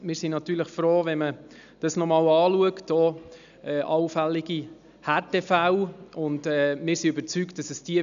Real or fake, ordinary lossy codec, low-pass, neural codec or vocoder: real; none; 7.2 kHz; none